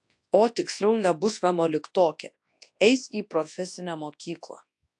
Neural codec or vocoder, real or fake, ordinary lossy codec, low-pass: codec, 24 kHz, 0.9 kbps, WavTokenizer, large speech release; fake; AAC, 64 kbps; 10.8 kHz